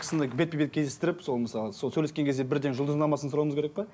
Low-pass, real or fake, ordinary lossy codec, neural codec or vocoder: none; real; none; none